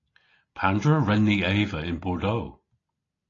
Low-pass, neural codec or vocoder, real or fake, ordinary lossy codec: 7.2 kHz; none; real; AAC, 32 kbps